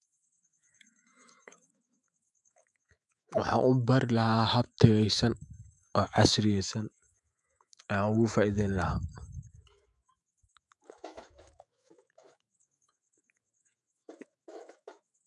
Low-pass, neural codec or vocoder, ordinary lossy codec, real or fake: 10.8 kHz; autoencoder, 48 kHz, 128 numbers a frame, DAC-VAE, trained on Japanese speech; none; fake